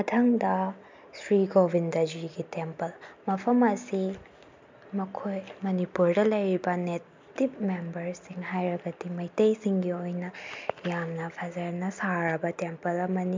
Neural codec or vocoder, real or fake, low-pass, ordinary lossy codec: none; real; 7.2 kHz; MP3, 64 kbps